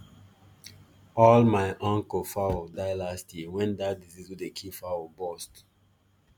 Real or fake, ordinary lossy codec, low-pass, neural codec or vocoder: real; none; none; none